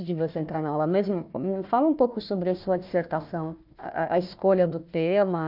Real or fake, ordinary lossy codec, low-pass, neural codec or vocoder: fake; none; 5.4 kHz; codec, 16 kHz, 1 kbps, FunCodec, trained on Chinese and English, 50 frames a second